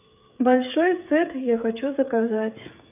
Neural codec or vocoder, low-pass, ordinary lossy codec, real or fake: codec, 16 kHz, 8 kbps, FreqCodec, smaller model; 3.6 kHz; none; fake